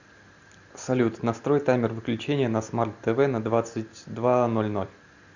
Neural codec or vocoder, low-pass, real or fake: none; 7.2 kHz; real